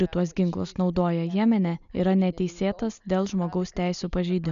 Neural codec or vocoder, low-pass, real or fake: none; 7.2 kHz; real